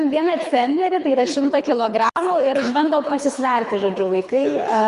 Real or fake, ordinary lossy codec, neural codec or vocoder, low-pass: fake; Opus, 64 kbps; codec, 24 kHz, 3 kbps, HILCodec; 10.8 kHz